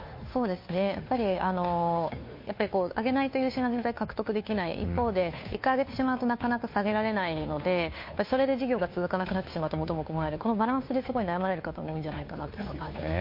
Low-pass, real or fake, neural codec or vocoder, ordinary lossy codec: 5.4 kHz; fake; codec, 16 kHz, 2 kbps, FunCodec, trained on Chinese and English, 25 frames a second; MP3, 32 kbps